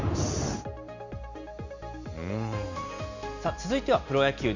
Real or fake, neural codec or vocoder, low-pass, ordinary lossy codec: real; none; 7.2 kHz; none